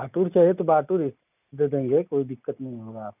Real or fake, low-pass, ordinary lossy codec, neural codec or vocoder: real; 3.6 kHz; none; none